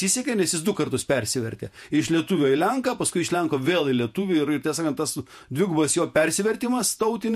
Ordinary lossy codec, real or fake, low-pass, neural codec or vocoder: MP3, 64 kbps; fake; 14.4 kHz; vocoder, 48 kHz, 128 mel bands, Vocos